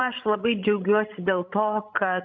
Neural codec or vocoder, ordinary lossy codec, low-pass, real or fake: codec, 16 kHz, 16 kbps, FreqCodec, larger model; MP3, 64 kbps; 7.2 kHz; fake